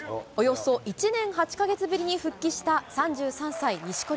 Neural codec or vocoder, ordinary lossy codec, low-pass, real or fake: none; none; none; real